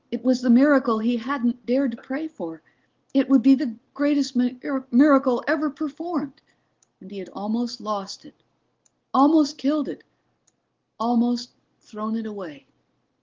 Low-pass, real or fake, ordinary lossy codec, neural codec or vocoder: 7.2 kHz; real; Opus, 16 kbps; none